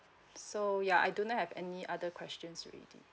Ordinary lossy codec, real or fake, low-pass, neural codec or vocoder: none; real; none; none